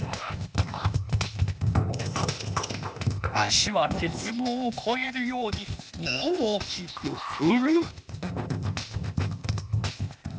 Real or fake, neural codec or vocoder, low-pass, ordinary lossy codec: fake; codec, 16 kHz, 0.8 kbps, ZipCodec; none; none